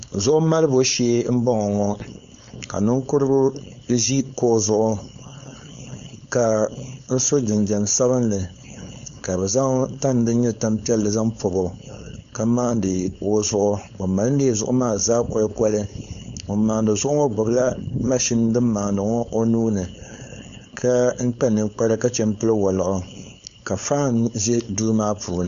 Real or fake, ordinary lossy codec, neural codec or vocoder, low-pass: fake; AAC, 96 kbps; codec, 16 kHz, 4.8 kbps, FACodec; 7.2 kHz